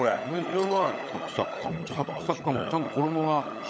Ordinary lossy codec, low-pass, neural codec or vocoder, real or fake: none; none; codec, 16 kHz, 16 kbps, FunCodec, trained on LibriTTS, 50 frames a second; fake